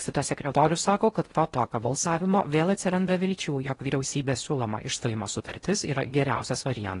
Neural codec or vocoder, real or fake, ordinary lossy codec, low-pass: codec, 16 kHz in and 24 kHz out, 0.6 kbps, FocalCodec, streaming, 4096 codes; fake; AAC, 32 kbps; 10.8 kHz